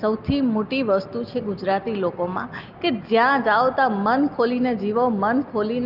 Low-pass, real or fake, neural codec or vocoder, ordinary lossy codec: 5.4 kHz; real; none; Opus, 32 kbps